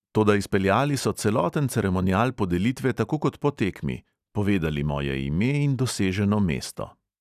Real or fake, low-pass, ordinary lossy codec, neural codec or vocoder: real; 14.4 kHz; none; none